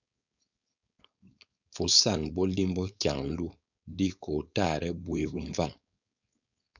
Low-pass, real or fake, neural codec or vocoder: 7.2 kHz; fake; codec, 16 kHz, 4.8 kbps, FACodec